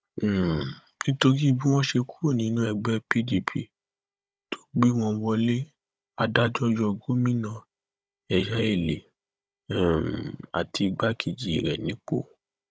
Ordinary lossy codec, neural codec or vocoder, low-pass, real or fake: none; codec, 16 kHz, 16 kbps, FunCodec, trained on Chinese and English, 50 frames a second; none; fake